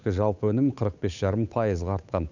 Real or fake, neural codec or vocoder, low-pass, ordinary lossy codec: fake; codec, 16 kHz, 8 kbps, FunCodec, trained on Chinese and English, 25 frames a second; 7.2 kHz; none